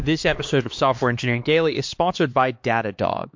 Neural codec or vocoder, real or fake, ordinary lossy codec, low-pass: codec, 16 kHz, 2 kbps, X-Codec, HuBERT features, trained on LibriSpeech; fake; MP3, 48 kbps; 7.2 kHz